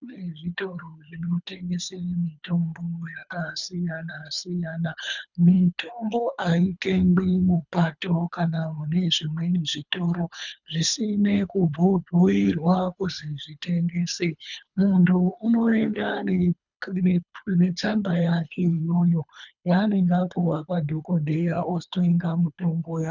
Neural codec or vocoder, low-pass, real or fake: codec, 24 kHz, 3 kbps, HILCodec; 7.2 kHz; fake